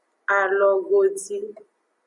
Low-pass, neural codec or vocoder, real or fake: 10.8 kHz; none; real